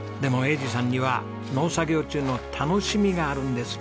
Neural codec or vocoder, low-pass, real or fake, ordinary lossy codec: none; none; real; none